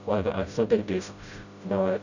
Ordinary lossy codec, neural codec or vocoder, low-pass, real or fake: none; codec, 16 kHz, 0.5 kbps, FreqCodec, smaller model; 7.2 kHz; fake